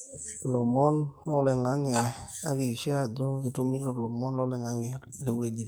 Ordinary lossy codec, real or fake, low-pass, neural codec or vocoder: none; fake; none; codec, 44.1 kHz, 2.6 kbps, SNAC